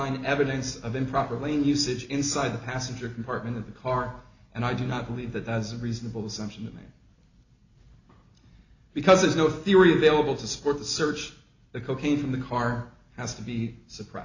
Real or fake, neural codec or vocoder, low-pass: real; none; 7.2 kHz